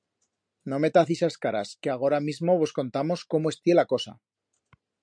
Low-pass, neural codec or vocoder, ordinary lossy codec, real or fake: 9.9 kHz; none; MP3, 96 kbps; real